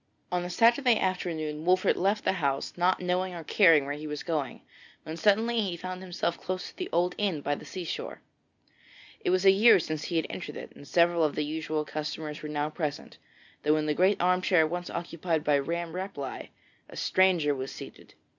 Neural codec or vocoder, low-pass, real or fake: none; 7.2 kHz; real